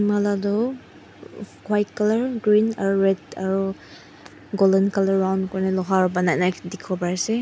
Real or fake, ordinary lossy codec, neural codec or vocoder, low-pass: real; none; none; none